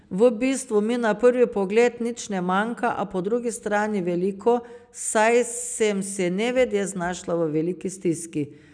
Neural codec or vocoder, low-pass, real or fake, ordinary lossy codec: none; 9.9 kHz; real; none